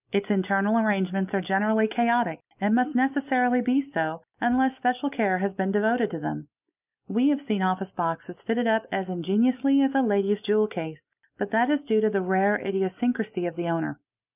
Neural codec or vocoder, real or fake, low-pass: none; real; 3.6 kHz